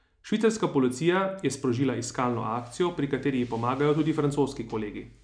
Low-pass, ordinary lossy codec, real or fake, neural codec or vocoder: 9.9 kHz; none; real; none